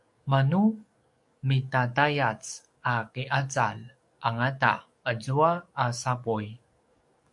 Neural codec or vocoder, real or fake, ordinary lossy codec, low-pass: codec, 44.1 kHz, 7.8 kbps, DAC; fake; MP3, 64 kbps; 10.8 kHz